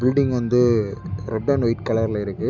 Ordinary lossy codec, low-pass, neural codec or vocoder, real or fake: none; 7.2 kHz; none; real